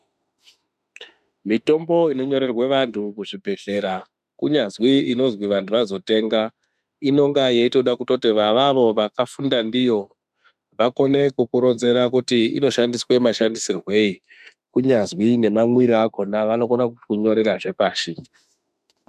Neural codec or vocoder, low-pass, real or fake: autoencoder, 48 kHz, 32 numbers a frame, DAC-VAE, trained on Japanese speech; 14.4 kHz; fake